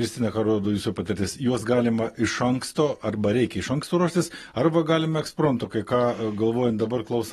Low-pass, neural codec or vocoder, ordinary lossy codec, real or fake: 19.8 kHz; none; AAC, 32 kbps; real